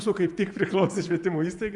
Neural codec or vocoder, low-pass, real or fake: vocoder, 24 kHz, 100 mel bands, Vocos; 10.8 kHz; fake